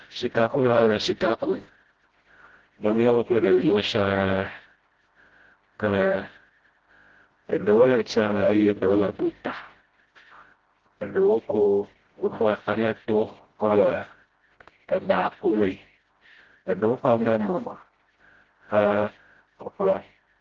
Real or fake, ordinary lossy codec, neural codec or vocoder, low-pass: fake; Opus, 16 kbps; codec, 16 kHz, 0.5 kbps, FreqCodec, smaller model; 7.2 kHz